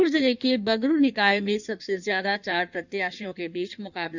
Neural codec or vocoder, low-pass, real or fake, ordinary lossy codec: codec, 16 kHz in and 24 kHz out, 1.1 kbps, FireRedTTS-2 codec; 7.2 kHz; fake; none